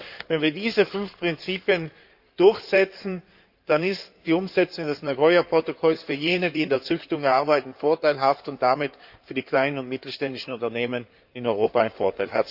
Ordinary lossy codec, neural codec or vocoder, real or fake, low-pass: none; codec, 16 kHz in and 24 kHz out, 2.2 kbps, FireRedTTS-2 codec; fake; 5.4 kHz